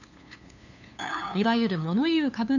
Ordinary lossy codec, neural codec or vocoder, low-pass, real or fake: none; codec, 16 kHz, 2 kbps, FunCodec, trained on LibriTTS, 25 frames a second; 7.2 kHz; fake